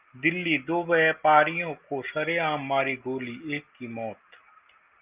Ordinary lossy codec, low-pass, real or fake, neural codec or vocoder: Opus, 24 kbps; 3.6 kHz; real; none